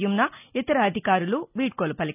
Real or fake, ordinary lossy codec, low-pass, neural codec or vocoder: real; none; 3.6 kHz; none